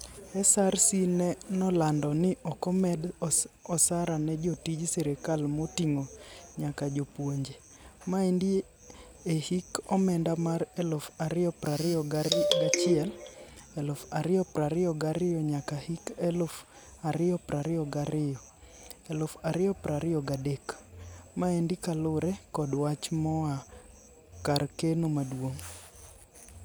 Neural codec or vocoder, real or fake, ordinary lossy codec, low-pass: none; real; none; none